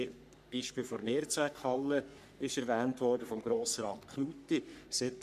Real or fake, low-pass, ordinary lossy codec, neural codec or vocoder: fake; 14.4 kHz; none; codec, 44.1 kHz, 3.4 kbps, Pupu-Codec